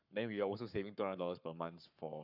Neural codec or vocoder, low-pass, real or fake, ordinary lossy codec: codec, 44.1 kHz, 7.8 kbps, Pupu-Codec; 5.4 kHz; fake; none